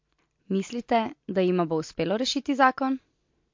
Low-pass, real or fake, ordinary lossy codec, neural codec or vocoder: 7.2 kHz; real; MP3, 48 kbps; none